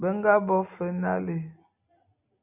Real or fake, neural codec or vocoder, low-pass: real; none; 3.6 kHz